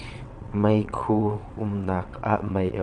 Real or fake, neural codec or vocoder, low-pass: fake; vocoder, 22.05 kHz, 80 mel bands, WaveNeXt; 9.9 kHz